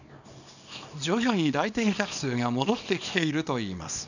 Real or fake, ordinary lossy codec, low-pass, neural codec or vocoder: fake; none; 7.2 kHz; codec, 24 kHz, 0.9 kbps, WavTokenizer, small release